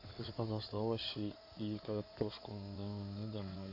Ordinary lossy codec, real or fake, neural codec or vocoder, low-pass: AAC, 32 kbps; fake; codec, 16 kHz in and 24 kHz out, 2.2 kbps, FireRedTTS-2 codec; 5.4 kHz